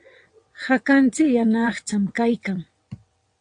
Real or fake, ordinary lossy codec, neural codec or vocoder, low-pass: fake; AAC, 64 kbps; vocoder, 22.05 kHz, 80 mel bands, WaveNeXt; 9.9 kHz